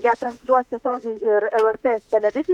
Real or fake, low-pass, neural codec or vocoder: fake; 19.8 kHz; autoencoder, 48 kHz, 32 numbers a frame, DAC-VAE, trained on Japanese speech